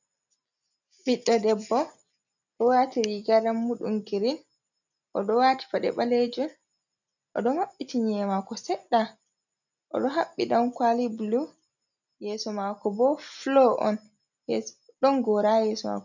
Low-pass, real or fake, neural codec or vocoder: 7.2 kHz; real; none